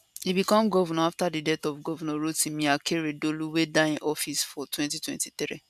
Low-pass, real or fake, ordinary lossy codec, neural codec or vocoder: 14.4 kHz; real; none; none